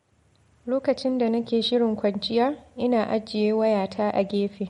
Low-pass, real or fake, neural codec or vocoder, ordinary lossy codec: 19.8 kHz; real; none; MP3, 48 kbps